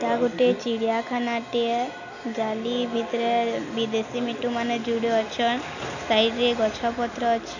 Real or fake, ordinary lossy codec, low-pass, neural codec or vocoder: real; none; 7.2 kHz; none